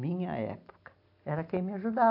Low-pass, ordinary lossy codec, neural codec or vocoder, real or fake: 5.4 kHz; AAC, 32 kbps; none; real